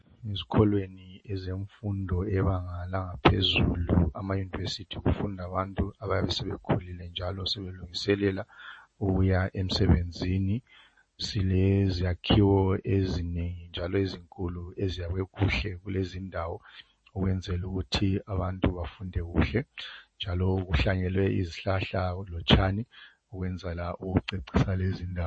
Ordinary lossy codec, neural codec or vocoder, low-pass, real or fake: MP3, 32 kbps; vocoder, 48 kHz, 128 mel bands, Vocos; 9.9 kHz; fake